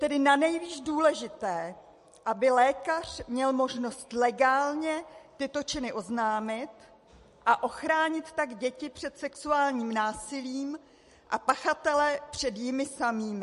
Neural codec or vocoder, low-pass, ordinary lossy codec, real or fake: none; 14.4 kHz; MP3, 48 kbps; real